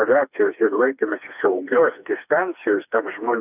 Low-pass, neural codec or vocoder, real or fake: 3.6 kHz; codec, 16 kHz, 2 kbps, FreqCodec, smaller model; fake